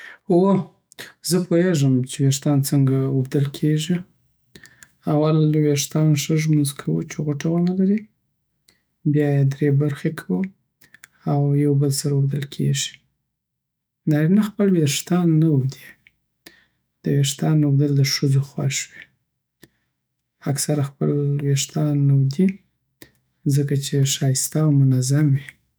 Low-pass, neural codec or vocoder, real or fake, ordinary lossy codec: none; autoencoder, 48 kHz, 128 numbers a frame, DAC-VAE, trained on Japanese speech; fake; none